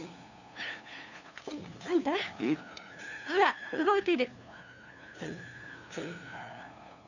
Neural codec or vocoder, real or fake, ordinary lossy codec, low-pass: codec, 16 kHz, 2 kbps, FunCodec, trained on LibriTTS, 25 frames a second; fake; none; 7.2 kHz